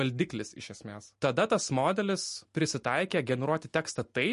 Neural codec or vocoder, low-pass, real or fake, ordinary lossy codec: none; 14.4 kHz; real; MP3, 48 kbps